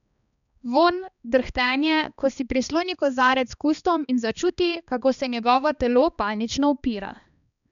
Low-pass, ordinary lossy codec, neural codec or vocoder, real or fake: 7.2 kHz; none; codec, 16 kHz, 2 kbps, X-Codec, HuBERT features, trained on balanced general audio; fake